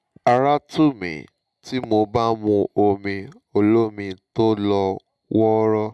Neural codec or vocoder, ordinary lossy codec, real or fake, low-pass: none; none; real; none